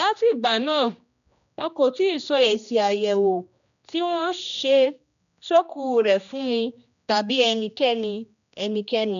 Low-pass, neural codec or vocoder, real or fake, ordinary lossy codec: 7.2 kHz; codec, 16 kHz, 1 kbps, X-Codec, HuBERT features, trained on general audio; fake; none